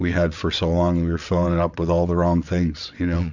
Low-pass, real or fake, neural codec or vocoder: 7.2 kHz; fake; vocoder, 22.05 kHz, 80 mel bands, WaveNeXt